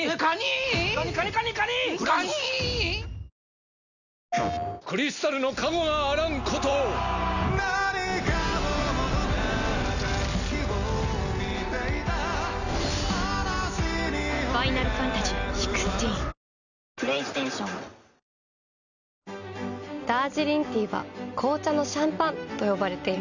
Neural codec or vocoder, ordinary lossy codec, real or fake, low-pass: none; none; real; 7.2 kHz